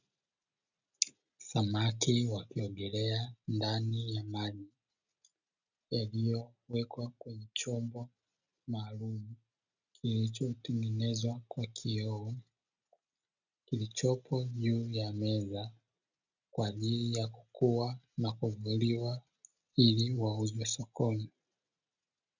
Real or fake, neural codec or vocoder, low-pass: real; none; 7.2 kHz